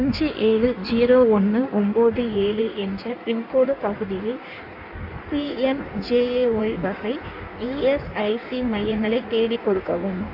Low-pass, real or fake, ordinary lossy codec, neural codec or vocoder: 5.4 kHz; fake; AAC, 48 kbps; codec, 16 kHz in and 24 kHz out, 1.1 kbps, FireRedTTS-2 codec